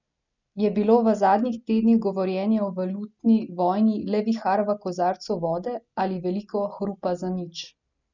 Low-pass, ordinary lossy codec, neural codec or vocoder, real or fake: 7.2 kHz; none; none; real